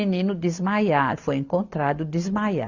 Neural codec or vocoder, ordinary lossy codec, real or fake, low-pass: none; Opus, 64 kbps; real; 7.2 kHz